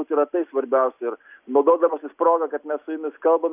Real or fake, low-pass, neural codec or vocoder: real; 3.6 kHz; none